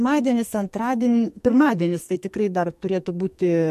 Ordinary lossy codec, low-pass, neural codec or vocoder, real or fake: MP3, 64 kbps; 14.4 kHz; codec, 44.1 kHz, 2.6 kbps, SNAC; fake